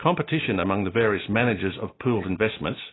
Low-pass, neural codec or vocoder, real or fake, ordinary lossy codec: 7.2 kHz; none; real; AAC, 16 kbps